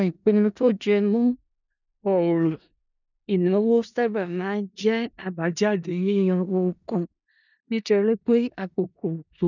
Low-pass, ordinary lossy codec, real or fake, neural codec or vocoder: 7.2 kHz; none; fake; codec, 16 kHz in and 24 kHz out, 0.4 kbps, LongCat-Audio-Codec, four codebook decoder